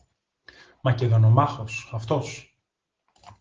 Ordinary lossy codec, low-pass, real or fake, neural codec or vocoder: Opus, 16 kbps; 7.2 kHz; real; none